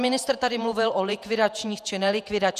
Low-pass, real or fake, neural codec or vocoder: 14.4 kHz; fake; vocoder, 48 kHz, 128 mel bands, Vocos